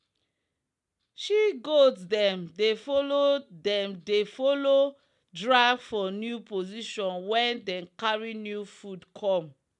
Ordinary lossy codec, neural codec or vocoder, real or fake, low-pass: MP3, 96 kbps; none; real; 10.8 kHz